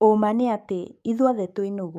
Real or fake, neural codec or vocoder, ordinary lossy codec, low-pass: fake; codec, 44.1 kHz, 7.8 kbps, DAC; none; 14.4 kHz